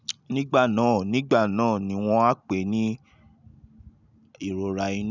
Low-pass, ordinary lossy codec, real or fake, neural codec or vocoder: 7.2 kHz; none; real; none